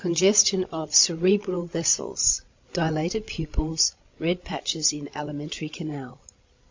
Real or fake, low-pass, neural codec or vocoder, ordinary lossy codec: fake; 7.2 kHz; codec, 16 kHz, 16 kbps, FreqCodec, larger model; MP3, 48 kbps